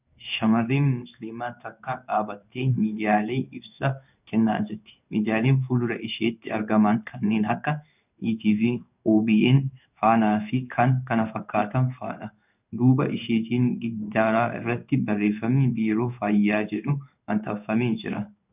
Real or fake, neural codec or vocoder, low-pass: fake; codec, 16 kHz in and 24 kHz out, 1 kbps, XY-Tokenizer; 3.6 kHz